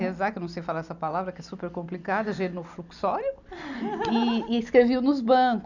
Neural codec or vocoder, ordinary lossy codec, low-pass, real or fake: none; none; 7.2 kHz; real